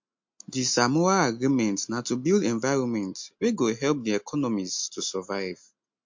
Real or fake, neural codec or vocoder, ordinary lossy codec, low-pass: real; none; MP3, 48 kbps; 7.2 kHz